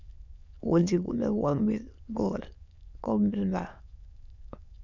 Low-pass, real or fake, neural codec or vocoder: 7.2 kHz; fake; autoencoder, 22.05 kHz, a latent of 192 numbers a frame, VITS, trained on many speakers